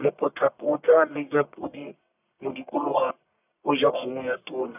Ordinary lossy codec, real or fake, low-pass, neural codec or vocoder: none; fake; 3.6 kHz; codec, 44.1 kHz, 1.7 kbps, Pupu-Codec